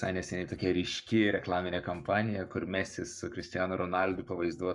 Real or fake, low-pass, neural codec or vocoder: fake; 10.8 kHz; codec, 44.1 kHz, 7.8 kbps, Pupu-Codec